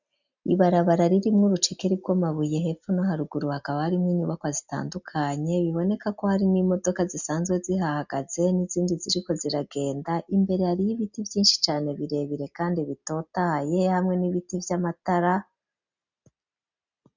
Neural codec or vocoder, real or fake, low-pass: none; real; 7.2 kHz